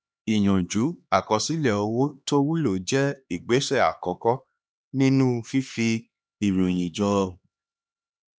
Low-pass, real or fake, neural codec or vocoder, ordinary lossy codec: none; fake; codec, 16 kHz, 2 kbps, X-Codec, HuBERT features, trained on LibriSpeech; none